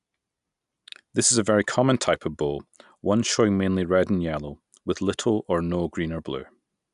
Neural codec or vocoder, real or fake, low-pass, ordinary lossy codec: none; real; 10.8 kHz; none